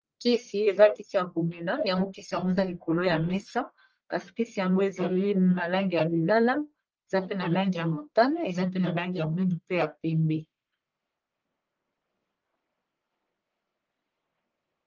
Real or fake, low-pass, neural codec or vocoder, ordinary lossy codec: fake; 7.2 kHz; codec, 44.1 kHz, 1.7 kbps, Pupu-Codec; Opus, 24 kbps